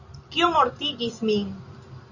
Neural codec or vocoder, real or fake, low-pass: none; real; 7.2 kHz